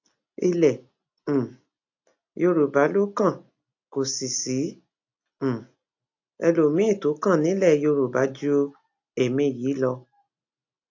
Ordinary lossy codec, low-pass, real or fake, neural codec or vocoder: AAC, 48 kbps; 7.2 kHz; real; none